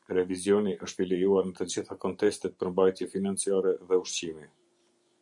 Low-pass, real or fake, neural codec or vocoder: 10.8 kHz; fake; vocoder, 24 kHz, 100 mel bands, Vocos